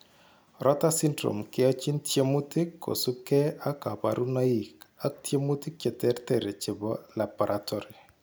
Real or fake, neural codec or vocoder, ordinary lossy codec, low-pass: real; none; none; none